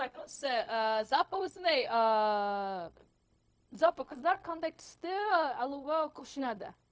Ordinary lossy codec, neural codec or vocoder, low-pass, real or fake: none; codec, 16 kHz, 0.4 kbps, LongCat-Audio-Codec; none; fake